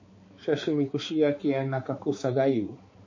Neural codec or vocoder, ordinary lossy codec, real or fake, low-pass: codec, 16 kHz, 4 kbps, X-Codec, HuBERT features, trained on balanced general audio; MP3, 32 kbps; fake; 7.2 kHz